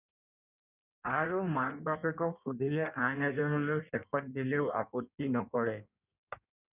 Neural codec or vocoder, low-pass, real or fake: codec, 16 kHz in and 24 kHz out, 1.1 kbps, FireRedTTS-2 codec; 3.6 kHz; fake